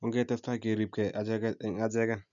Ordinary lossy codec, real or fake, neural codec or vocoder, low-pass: none; real; none; 7.2 kHz